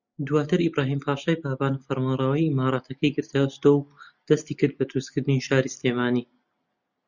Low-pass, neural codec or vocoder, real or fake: 7.2 kHz; none; real